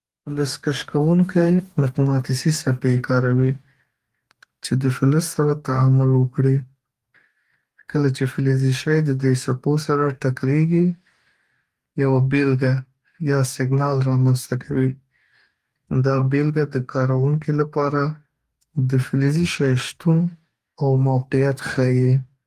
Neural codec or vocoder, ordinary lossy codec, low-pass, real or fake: codec, 44.1 kHz, 2.6 kbps, DAC; Opus, 32 kbps; 14.4 kHz; fake